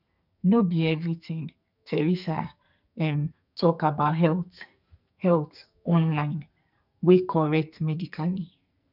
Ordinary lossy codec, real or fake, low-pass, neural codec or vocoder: none; fake; 5.4 kHz; codec, 44.1 kHz, 2.6 kbps, SNAC